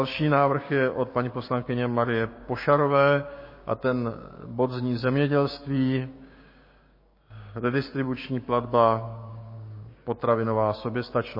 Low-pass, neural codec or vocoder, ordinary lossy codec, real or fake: 5.4 kHz; codec, 16 kHz, 6 kbps, DAC; MP3, 24 kbps; fake